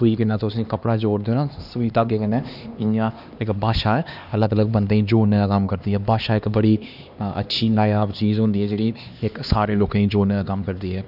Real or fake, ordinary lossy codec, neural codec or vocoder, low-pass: fake; none; codec, 16 kHz, 2 kbps, X-Codec, HuBERT features, trained on LibriSpeech; 5.4 kHz